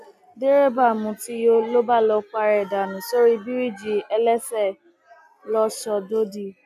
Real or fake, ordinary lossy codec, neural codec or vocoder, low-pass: real; none; none; 14.4 kHz